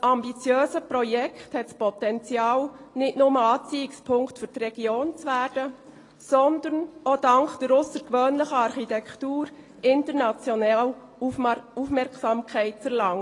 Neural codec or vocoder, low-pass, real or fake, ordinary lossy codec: none; 10.8 kHz; real; AAC, 32 kbps